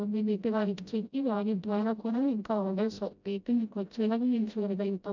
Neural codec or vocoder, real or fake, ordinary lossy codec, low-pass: codec, 16 kHz, 0.5 kbps, FreqCodec, smaller model; fake; none; 7.2 kHz